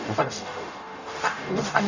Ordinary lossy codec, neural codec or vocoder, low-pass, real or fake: none; codec, 44.1 kHz, 0.9 kbps, DAC; 7.2 kHz; fake